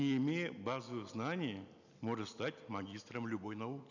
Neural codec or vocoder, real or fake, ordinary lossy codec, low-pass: none; real; none; 7.2 kHz